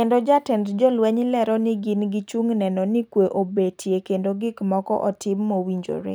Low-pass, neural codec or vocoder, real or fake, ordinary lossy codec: none; none; real; none